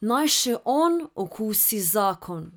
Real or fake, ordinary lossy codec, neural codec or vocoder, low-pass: real; none; none; none